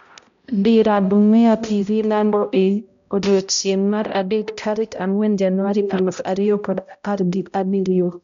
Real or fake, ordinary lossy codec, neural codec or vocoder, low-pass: fake; MP3, 64 kbps; codec, 16 kHz, 0.5 kbps, X-Codec, HuBERT features, trained on balanced general audio; 7.2 kHz